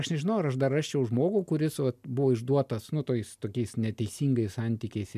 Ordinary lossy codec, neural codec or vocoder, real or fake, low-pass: MP3, 96 kbps; none; real; 14.4 kHz